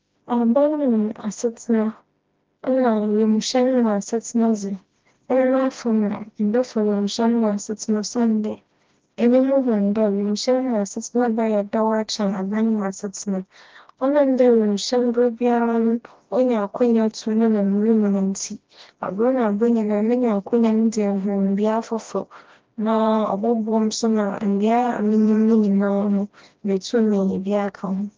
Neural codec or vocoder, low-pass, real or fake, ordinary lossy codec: codec, 16 kHz, 1 kbps, FreqCodec, smaller model; 7.2 kHz; fake; Opus, 24 kbps